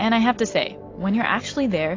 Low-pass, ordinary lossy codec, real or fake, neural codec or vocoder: 7.2 kHz; AAC, 32 kbps; real; none